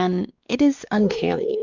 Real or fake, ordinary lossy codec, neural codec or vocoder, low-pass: fake; Opus, 64 kbps; codec, 16 kHz, 2 kbps, X-Codec, WavLM features, trained on Multilingual LibriSpeech; 7.2 kHz